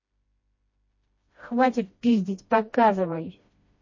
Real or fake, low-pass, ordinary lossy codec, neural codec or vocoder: fake; 7.2 kHz; MP3, 32 kbps; codec, 16 kHz, 1 kbps, FreqCodec, smaller model